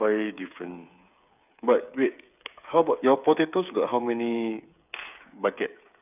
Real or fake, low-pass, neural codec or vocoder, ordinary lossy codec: fake; 3.6 kHz; codec, 16 kHz, 8 kbps, FreqCodec, smaller model; none